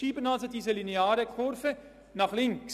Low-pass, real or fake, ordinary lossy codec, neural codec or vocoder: 14.4 kHz; real; none; none